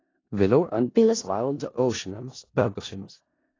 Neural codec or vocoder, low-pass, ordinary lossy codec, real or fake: codec, 16 kHz in and 24 kHz out, 0.4 kbps, LongCat-Audio-Codec, four codebook decoder; 7.2 kHz; AAC, 32 kbps; fake